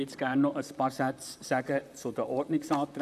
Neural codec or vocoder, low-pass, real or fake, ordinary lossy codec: vocoder, 44.1 kHz, 128 mel bands, Pupu-Vocoder; 14.4 kHz; fake; none